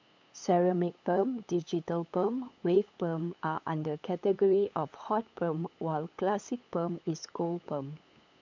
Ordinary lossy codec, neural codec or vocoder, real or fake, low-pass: MP3, 64 kbps; codec, 16 kHz, 8 kbps, FunCodec, trained on LibriTTS, 25 frames a second; fake; 7.2 kHz